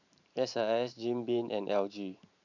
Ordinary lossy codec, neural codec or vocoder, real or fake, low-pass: none; vocoder, 44.1 kHz, 128 mel bands every 512 samples, BigVGAN v2; fake; 7.2 kHz